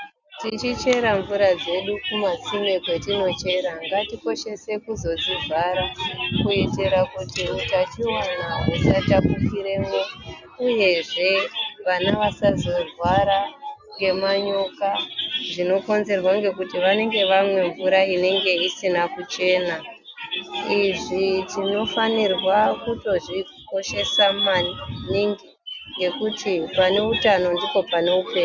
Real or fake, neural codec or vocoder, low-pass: real; none; 7.2 kHz